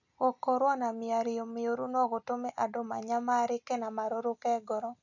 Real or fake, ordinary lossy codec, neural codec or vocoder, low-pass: real; none; none; 7.2 kHz